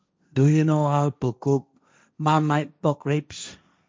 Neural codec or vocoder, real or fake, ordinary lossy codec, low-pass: codec, 16 kHz, 1.1 kbps, Voila-Tokenizer; fake; none; none